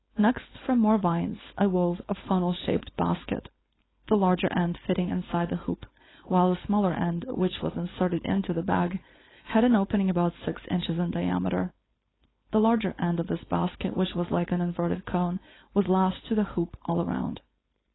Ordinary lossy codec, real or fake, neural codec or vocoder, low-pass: AAC, 16 kbps; fake; codec, 16 kHz, 4.8 kbps, FACodec; 7.2 kHz